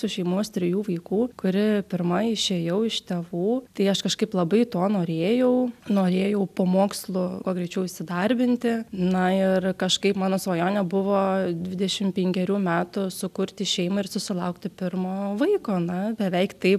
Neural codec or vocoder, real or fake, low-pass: none; real; 14.4 kHz